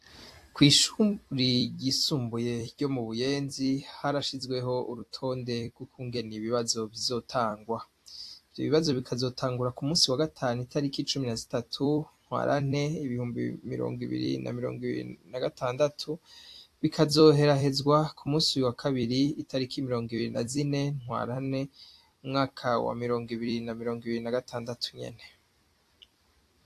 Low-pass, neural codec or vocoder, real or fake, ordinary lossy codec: 14.4 kHz; vocoder, 44.1 kHz, 128 mel bands every 256 samples, BigVGAN v2; fake; AAC, 64 kbps